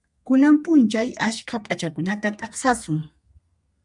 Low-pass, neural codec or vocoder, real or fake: 10.8 kHz; codec, 32 kHz, 1.9 kbps, SNAC; fake